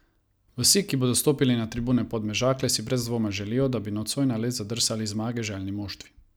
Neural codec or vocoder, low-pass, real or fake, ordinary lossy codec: none; none; real; none